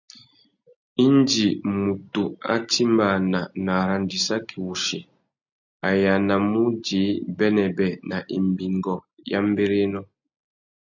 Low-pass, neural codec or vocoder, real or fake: 7.2 kHz; none; real